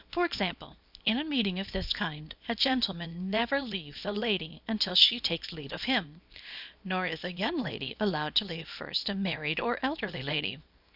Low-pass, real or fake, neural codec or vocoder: 5.4 kHz; fake; codec, 24 kHz, 0.9 kbps, WavTokenizer, small release